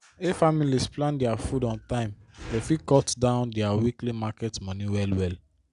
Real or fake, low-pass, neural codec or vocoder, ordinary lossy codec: real; 10.8 kHz; none; none